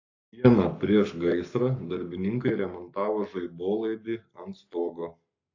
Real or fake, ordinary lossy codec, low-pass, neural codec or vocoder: fake; AAC, 48 kbps; 7.2 kHz; codec, 44.1 kHz, 7.8 kbps, Pupu-Codec